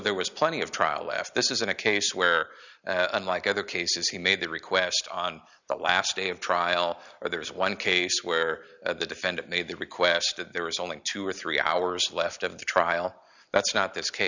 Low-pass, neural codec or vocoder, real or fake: 7.2 kHz; none; real